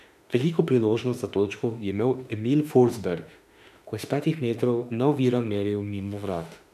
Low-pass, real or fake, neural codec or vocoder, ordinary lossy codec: 14.4 kHz; fake; autoencoder, 48 kHz, 32 numbers a frame, DAC-VAE, trained on Japanese speech; none